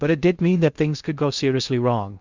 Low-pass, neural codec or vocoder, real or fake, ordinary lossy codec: 7.2 kHz; codec, 16 kHz in and 24 kHz out, 0.6 kbps, FocalCodec, streaming, 2048 codes; fake; Opus, 64 kbps